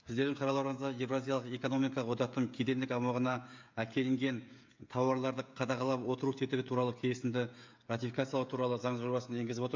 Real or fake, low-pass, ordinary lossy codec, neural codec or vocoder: fake; 7.2 kHz; MP3, 64 kbps; codec, 16 kHz, 16 kbps, FreqCodec, smaller model